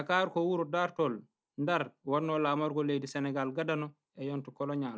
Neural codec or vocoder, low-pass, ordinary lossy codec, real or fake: none; none; none; real